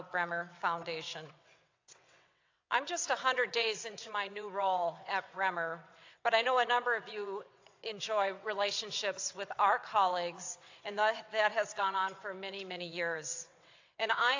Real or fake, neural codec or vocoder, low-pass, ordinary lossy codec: fake; vocoder, 22.05 kHz, 80 mel bands, WaveNeXt; 7.2 kHz; AAC, 48 kbps